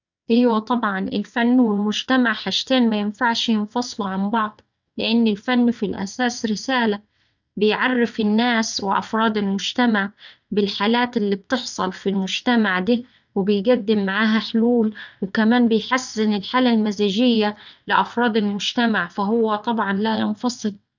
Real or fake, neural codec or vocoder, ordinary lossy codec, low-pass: fake; vocoder, 22.05 kHz, 80 mel bands, WaveNeXt; none; 7.2 kHz